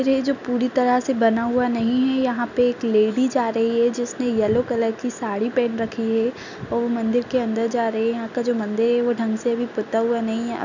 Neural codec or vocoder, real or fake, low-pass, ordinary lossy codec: none; real; 7.2 kHz; none